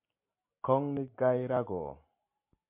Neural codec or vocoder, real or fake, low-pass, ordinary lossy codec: none; real; 3.6 kHz; MP3, 32 kbps